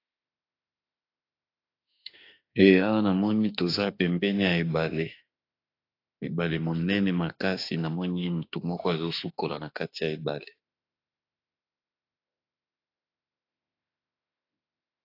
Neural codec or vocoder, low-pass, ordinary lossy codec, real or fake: autoencoder, 48 kHz, 32 numbers a frame, DAC-VAE, trained on Japanese speech; 5.4 kHz; AAC, 32 kbps; fake